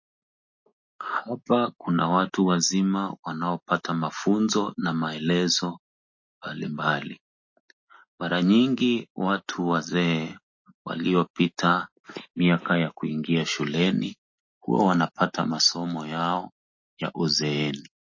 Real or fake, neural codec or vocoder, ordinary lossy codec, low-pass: real; none; MP3, 32 kbps; 7.2 kHz